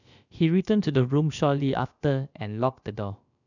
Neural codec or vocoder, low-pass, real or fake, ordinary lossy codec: codec, 16 kHz, about 1 kbps, DyCAST, with the encoder's durations; 7.2 kHz; fake; none